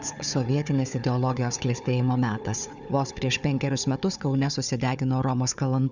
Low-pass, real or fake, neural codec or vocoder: 7.2 kHz; fake; codec, 16 kHz, 4 kbps, FunCodec, trained on Chinese and English, 50 frames a second